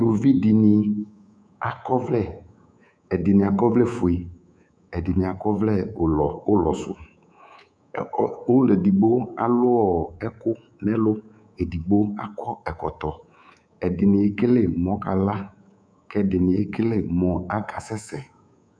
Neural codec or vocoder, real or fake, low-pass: codec, 24 kHz, 3.1 kbps, DualCodec; fake; 9.9 kHz